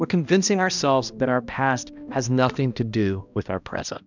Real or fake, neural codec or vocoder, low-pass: fake; codec, 16 kHz, 1 kbps, X-Codec, HuBERT features, trained on balanced general audio; 7.2 kHz